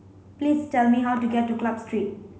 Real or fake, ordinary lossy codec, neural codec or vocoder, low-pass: real; none; none; none